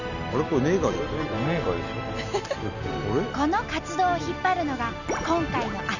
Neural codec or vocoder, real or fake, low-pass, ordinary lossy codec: none; real; 7.2 kHz; none